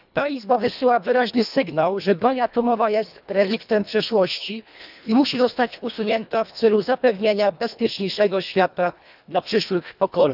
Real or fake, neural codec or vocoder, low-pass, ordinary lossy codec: fake; codec, 24 kHz, 1.5 kbps, HILCodec; 5.4 kHz; none